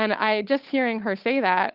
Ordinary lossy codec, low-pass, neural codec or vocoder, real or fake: Opus, 32 kbps; 5.4 kHz; codec, 16 kHz, 8 kbps, FunCodec, trained on Chinese and English, 25 frames a second; fake